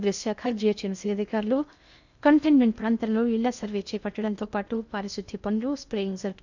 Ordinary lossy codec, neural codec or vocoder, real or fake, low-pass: none; codec, 16 kHz in and 24 kHz out, 0.6 kbps, FocalCodec, streaming, 2048 codes; fake; 7.2 kHz